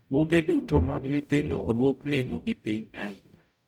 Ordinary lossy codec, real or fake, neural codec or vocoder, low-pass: none; fake; codec, 44.1 kHz, 0.9 kbps, DAC; 19.8 kHz